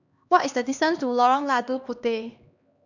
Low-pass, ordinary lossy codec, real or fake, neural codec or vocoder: 7.2 kHz; none; fake; codec, 16 kHz, 2 kbps, X-Codec, HuBERT features, trained on LibriSpeech